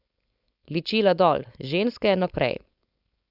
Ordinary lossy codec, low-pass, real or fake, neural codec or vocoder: Opus, 64 kbps; 5.4 kHz; fake; codec, 16 kHz, 4.8 kbps, FACodec